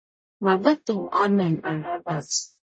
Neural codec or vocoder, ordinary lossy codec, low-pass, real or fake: codec, 44.1 kHz, 0.9 kbps, DAC; MP3, 32 kbps; 9.9 kHz; fake